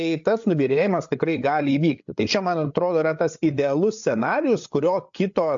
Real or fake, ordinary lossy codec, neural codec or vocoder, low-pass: fake; AAC, 64 kbps; codec, 16 kHz, 8 kbps, FreqCodec, larger model; 7.2 kHz